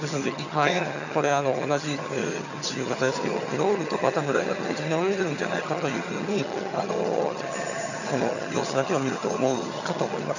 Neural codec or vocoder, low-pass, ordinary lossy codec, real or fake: vocoder, 22.05 kHz, 80 mel bands, HiFi-GAN; 7.2 kHz; none; fake